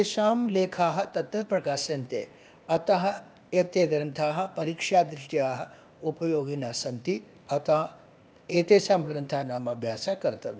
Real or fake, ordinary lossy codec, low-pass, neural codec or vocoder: fake; none; none; codec, 16 kHz, 0.8 kbps, ZipCodec